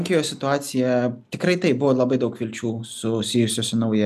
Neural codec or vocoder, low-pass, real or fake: none; 14.4 kHz; real